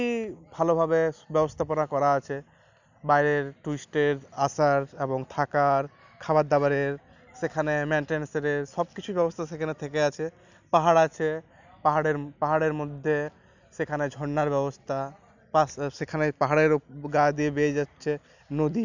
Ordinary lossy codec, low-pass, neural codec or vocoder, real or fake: none; 7.2 kHz; none; real